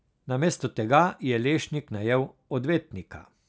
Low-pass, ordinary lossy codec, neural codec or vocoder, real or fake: none; none; none; real